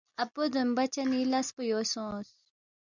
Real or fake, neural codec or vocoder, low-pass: real; none; 7.2 kHz